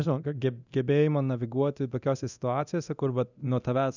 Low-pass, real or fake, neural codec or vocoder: 7.2 kHz; fake; codec, 24 kHz, 0.9 kbps, DualCodec